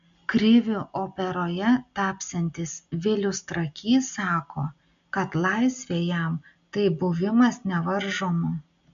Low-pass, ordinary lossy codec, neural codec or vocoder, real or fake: 7.2 kHz; MP3, 64 kbps; none; real